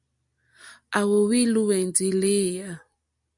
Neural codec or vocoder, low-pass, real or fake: none; 10.8 kHz; real